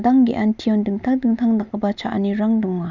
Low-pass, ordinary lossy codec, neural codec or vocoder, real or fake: 7.2 kHz; none; none; real